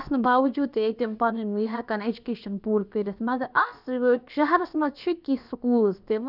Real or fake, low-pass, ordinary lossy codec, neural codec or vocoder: fake; 5.4 kHz; none; codec, 16 kHz, about 1 kbps, DyCAST, with the encoder's durations